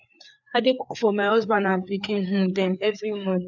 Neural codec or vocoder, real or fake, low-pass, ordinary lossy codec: codec, 16 kHz, 4 kbps, FreqCodec, larger model; fake; 7.2 kHz; none